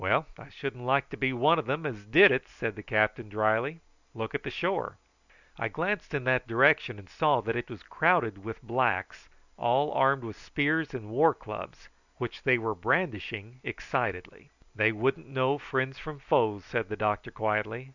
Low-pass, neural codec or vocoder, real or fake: 7.2 kHz; none; real